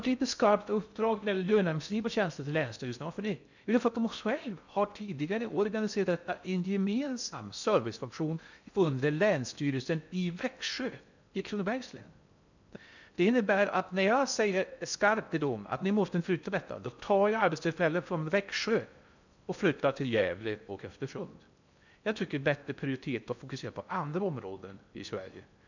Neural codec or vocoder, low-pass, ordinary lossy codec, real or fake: codec, 16 kHz in and 24 kHz out, 0.6 kbps, FocalCodec, streaming, 2048 codes; 7.2 kHz; none; fake